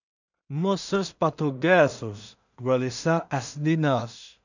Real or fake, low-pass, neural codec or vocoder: fake; 7.2 kHz; codec, 16 kHz in and 24 kHz out, 0.4 kbps, LongCat-Audio-Codec, two codebook decoder